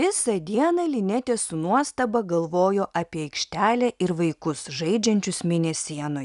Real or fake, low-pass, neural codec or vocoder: real; 10.8 kHz; none